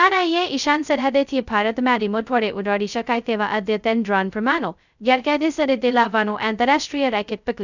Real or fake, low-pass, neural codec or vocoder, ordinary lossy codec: fake; 7.2 kHz; codec, 16 kHz, 0.2 kbps, FocalCodec; none